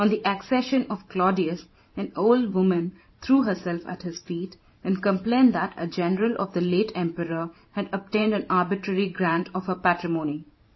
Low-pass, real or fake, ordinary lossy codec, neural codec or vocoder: 7.2 kHz; fake; MP3, 24 kbps; vocoder, 44.1 kHz, 128 mel bands every 512 samples, BigVGAN v2